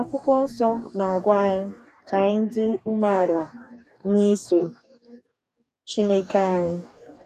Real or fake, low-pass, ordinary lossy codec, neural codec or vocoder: fake; 14.4 kHz; none; codec, 44.1 kHz, 2.6 kbps, DAC